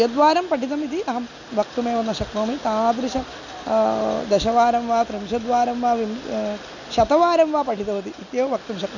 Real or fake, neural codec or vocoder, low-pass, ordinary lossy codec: real; none; 7.2 kHz; none